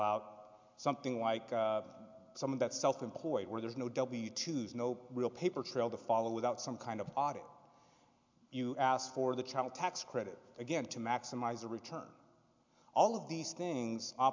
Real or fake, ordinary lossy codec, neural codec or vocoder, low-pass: real; MP3, 64 kbps; none; 7.2 kHz